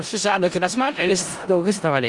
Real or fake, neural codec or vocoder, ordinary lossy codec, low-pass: fake; codec, 16 kHz in and 24 kHz out, 0.4 kbps, LongCat-Audio-Codec, four codebook decoder; Opus, 32 kbps; 10.8 kHz